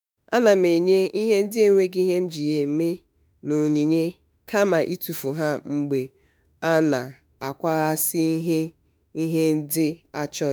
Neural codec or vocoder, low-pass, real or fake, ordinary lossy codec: autoencoder, 48 kHz, 32 numbers a frame, DAC-VAE, trained on Japanese speech; none; fake; none